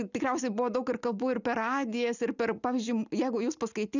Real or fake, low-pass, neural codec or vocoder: real; 7.2 kHz; none